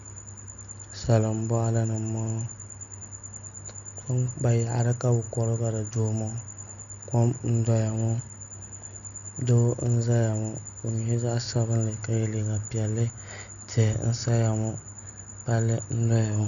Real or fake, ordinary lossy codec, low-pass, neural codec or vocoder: real; MP3, 64 kbps; 7.2 kHz; none